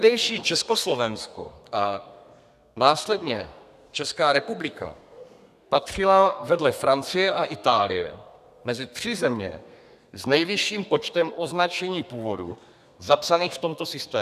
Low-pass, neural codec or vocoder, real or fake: 14.4 kHz; codec, 32 kHz, 1.9 kbps, SNAC; fake